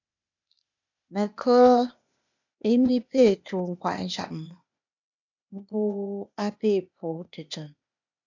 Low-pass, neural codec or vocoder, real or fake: 7.2 kHz; codec, 16 kHz, 0.8 kbps, ZipCodec; fake